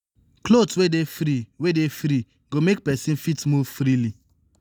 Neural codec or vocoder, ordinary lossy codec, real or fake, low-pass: none; none; real; none